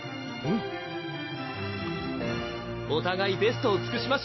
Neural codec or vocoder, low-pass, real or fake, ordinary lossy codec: none; 7.2 kHz; real; MP3, 24 kbps